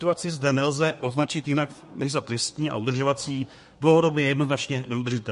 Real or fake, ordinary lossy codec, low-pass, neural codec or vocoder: fake; MP3, 48 kbps; 10.8 kHz; codec, 24 kHz, 1 kbps, SNAC